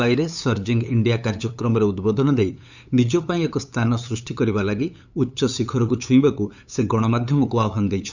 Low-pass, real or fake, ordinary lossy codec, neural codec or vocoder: 7.2 kHz; fake; none; codec, 16 kHz, 8 kbps, FunCodec, trained on LibriTTS, 25 frames a second